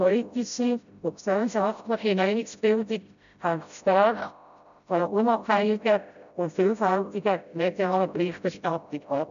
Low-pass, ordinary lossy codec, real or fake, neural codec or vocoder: 7.2 kHz; none; fake; codec, 16 kHz, 0.5 kbps, FreqCodec, smaller model